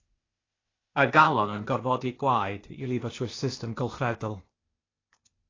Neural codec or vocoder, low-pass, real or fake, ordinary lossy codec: codec, 16 kHz, 0.8 kbps, ZipCodec; 7.2 kHz; fake; AAC, 32 kbps